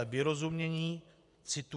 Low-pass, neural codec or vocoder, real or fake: 10.8 kHz; vocoder, 24 kHz, 100 mel bands, Vocos; fake